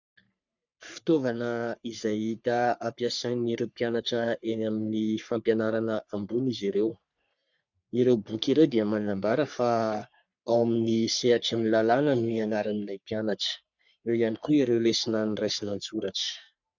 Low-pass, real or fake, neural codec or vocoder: 7.2 kHz; fake; codec, 44.1 kHz, 3.4 kbps, Pupu-Codec